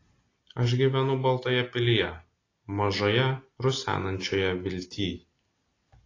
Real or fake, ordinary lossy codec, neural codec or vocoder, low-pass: real; AAC, 32 kbps; none; 7.2 kHz